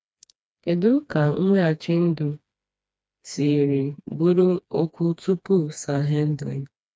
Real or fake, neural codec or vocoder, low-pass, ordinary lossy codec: fake; codec, 16 kHz, 2 kbps, FreqCodec, smaller model; none; none